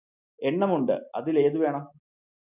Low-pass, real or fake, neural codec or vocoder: 3.6 kHz; real; none